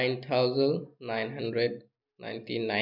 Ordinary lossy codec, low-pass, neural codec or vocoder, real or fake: none; 5.4 kHz; none; real